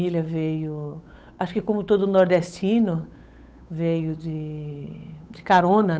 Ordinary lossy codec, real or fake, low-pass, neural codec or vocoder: none; fake; none; codec, 16 kHz, 8 kbps, FunCodec, trained on Chinese and English, 25 frames a second